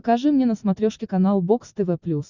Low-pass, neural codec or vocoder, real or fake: 7.2 kHz; none; real